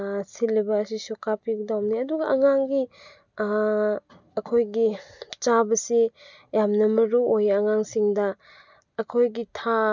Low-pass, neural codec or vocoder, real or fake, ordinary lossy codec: 7.2 kHz; none; real; none